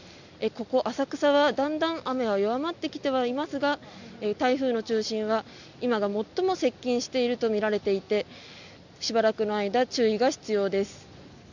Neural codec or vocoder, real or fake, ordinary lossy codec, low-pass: none; real; none; 7.2 kHz